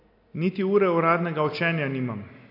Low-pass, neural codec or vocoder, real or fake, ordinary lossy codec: 5.4 kHz; none; real; AAC, 32 kbps